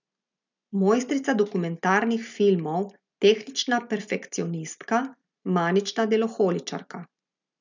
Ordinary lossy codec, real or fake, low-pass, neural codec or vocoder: none; real; 7.2 kHz; none